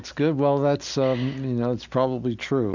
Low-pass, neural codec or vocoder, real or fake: 7.2 kHz; none; real